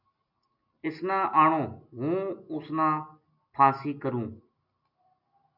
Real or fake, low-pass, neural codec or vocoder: real; 5.4 kHz; none